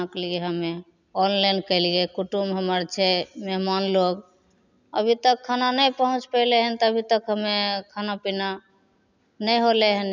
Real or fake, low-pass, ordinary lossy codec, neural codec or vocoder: real; 7.2 kHz; none; none